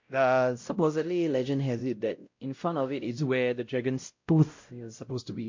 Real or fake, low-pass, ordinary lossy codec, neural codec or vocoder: fake; 7.2 kHz; MP3, 48 kbps; codec, 16 kHz, 0.5 kbps, X-Codec, WavLM features, trained on Multilingual LibriSpeech